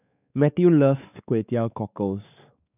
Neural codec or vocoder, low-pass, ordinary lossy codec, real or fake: codec, 16 kHz, 4 kbps, X-Codec, WavLM features, trained on Multilingual LibriSpeech; 3.6 kHz; none; fake